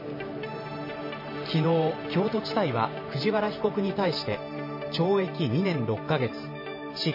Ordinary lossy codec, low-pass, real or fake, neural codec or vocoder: MP3, 24 kbps; 5.4 kHz; fake; vocoder, 44.1 kHz, 128 mel bands every 512 samples, BigVGAN v2